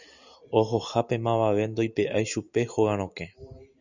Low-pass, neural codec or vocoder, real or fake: 7.2 kHz; none; real